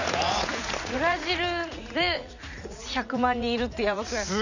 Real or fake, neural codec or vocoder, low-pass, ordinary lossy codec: fake; vocoder, 44.1 kHz, 80 mel bands, Vocos; 7.2 kHz; none